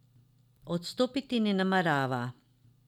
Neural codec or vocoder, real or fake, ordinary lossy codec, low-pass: none; real; none; 19.8 kHz